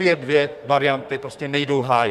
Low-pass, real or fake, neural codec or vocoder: 14.4 kHz; fake; codec, 32 kHz, 1.9 kbps, SNAC